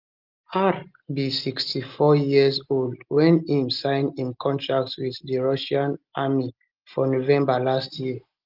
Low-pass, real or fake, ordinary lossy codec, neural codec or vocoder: 5.4 kHz; real; Opus, 32 kbps; none